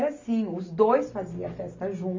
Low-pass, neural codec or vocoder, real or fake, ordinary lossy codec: 7.2 kHz; none; real; MP3, 48 kbps